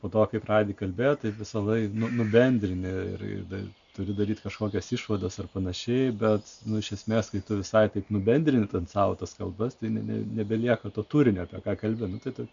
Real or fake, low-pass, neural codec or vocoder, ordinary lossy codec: real; 7.2 kHz; none; AAC, 64 kbps